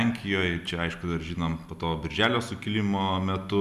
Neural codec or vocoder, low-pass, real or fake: none; 14.4 kHz; real